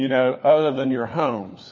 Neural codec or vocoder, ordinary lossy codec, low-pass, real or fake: codec, 16 kHz, 4 kbps, FunCodec, trained on LibriTTS, 50 frames a second; MP3, 32 kbps; 7.2 kHz; fake